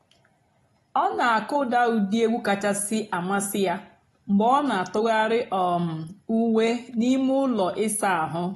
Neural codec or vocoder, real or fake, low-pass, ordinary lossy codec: none; real; 19.8 kHz; AAC, 32 kbps